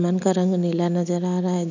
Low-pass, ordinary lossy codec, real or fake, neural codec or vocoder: 7.2 kHz; none; real; none